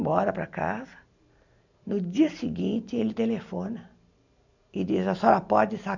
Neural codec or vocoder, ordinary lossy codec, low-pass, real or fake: none; none; 7.2 kHz; real